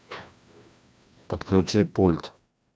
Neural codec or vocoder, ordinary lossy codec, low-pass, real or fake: codec, 16 kHz, 1 kbps, FreqCodec, larger model; none; none; fake